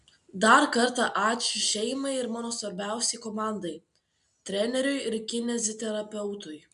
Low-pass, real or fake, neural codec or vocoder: 10.8 kHz; real; none